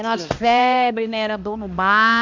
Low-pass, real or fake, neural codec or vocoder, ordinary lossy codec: 7.2 kHz; fake; codec, 16 kHz, 1 kbps, X-Codec, HuBERT features, trained on balanced general audio; MP3, 64 kbps